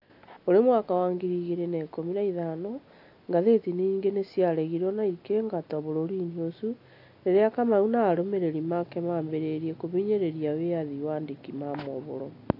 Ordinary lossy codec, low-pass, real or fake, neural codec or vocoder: AAC, 32 kbps; 5.4 kHz; real; none